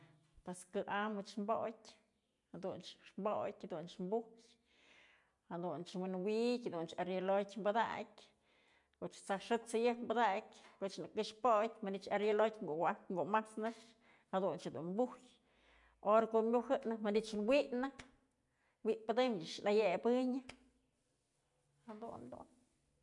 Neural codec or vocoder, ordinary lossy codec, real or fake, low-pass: none; none; real; 10.8 kHz